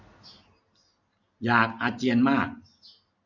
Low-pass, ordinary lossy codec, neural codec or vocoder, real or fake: 7.2 kHz; none; none; real